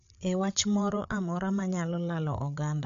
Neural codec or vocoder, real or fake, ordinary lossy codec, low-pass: codec, 16 kHz, 8 kbps, FreqCodec, larger model; fake; MP3, 64 kbps; 7.2 kHz